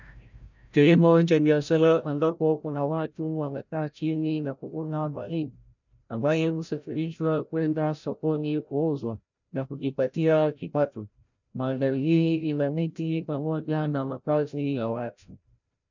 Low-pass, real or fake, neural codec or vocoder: 7.2 kHz; fake; codec, 16 kHz, 0.5 kbps, FreqCodec, larger model